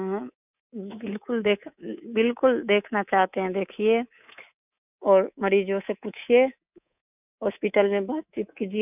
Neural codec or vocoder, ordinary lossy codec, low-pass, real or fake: codec, 24 kHz, 3.1 kbps, DualCodec; none; 3.6 kHz; fake